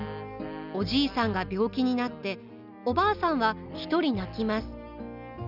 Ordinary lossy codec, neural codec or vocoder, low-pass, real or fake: none; none; 5.4 kHz; real